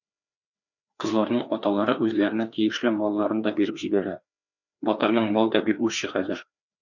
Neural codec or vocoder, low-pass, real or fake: codec, 16 kHz, 2 kbps, FreqCodec, larger model; 7.2 kHz; fake